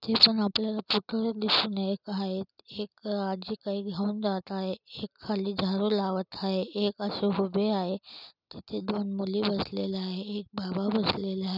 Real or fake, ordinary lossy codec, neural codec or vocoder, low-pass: real; none; none; 5.4 kHz